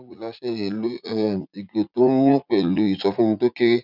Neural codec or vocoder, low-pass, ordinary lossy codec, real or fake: vocoder, 44.1 kHz, 80 mel bands, Vocos; 5.4 kHz; none; fake